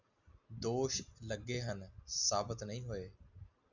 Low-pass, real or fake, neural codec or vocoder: 7.2 kHz; real; none